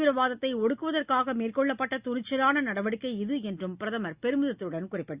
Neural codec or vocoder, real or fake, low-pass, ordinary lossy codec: none; real; 3.6 kHz; Opus, 64 kbps